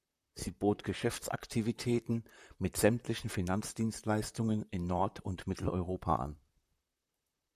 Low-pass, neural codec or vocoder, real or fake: 14.4 kHz; vocoder, 44.1 kHz, 128 mel bands, Pupu-Vocoder; fake